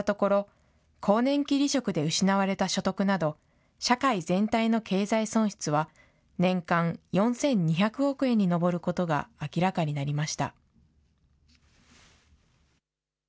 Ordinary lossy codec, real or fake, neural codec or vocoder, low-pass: none; real; none; none